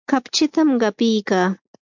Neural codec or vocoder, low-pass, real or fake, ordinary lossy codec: none; 7.2 kHz; real; MP3, 64 kbps